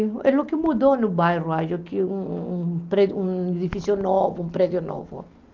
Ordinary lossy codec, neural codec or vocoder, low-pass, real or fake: Opus, 24 kbps; none; 7.2 kHz; real